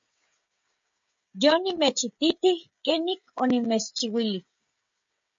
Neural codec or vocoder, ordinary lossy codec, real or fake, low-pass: codec, 16 kHz, 16 kbps, FreqCodec, smaller model; MP3, 48 kbps; fake; 7.2 kHz